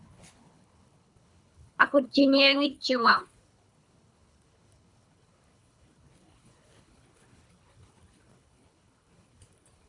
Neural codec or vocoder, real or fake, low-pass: codec, 24 kHz, 3 kbps, HILCodec; fake; 10.8 kHz